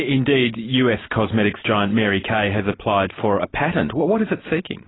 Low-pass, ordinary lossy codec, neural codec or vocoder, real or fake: 7.2 kHz; AAC, 16 kbps; none; real